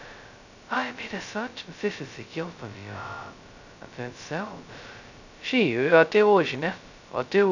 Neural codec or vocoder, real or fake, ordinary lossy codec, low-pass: codec, 16 kHz, 0.2 kbps, FocalCodec; fake; none; 7.2 kHz